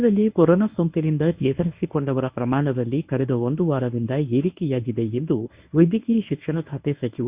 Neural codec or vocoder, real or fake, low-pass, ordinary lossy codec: codec, 24 kHz, 0.9 kbps, WavTokenizer, medium speech release version 1; fake; 3.6 kHz; Opus, 64 kbps